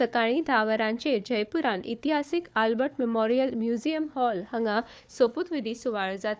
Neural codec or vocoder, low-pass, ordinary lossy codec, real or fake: codec, 16 kHz, 4 kbps, FunCodec, trained on Chinese and English, 50 frames a second; none; none; fake